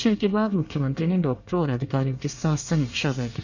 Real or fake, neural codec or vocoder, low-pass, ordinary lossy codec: fake; codec, 24 kHz, 1 kbps, SNAC; 7.2 kHz; none